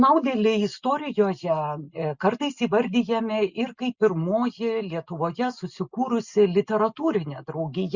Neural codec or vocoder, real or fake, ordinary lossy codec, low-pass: none; real; Opus, 64 kbps; 7.2 kHz